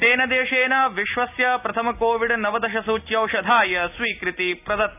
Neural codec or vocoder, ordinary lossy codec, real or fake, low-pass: none; none; real; 3.6 kHz